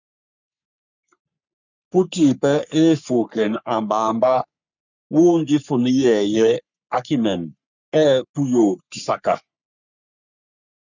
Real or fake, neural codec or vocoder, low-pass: fake; codec, 44.1 kHz, 3.4 kbps, Pupu-Codec; 7.2 kHz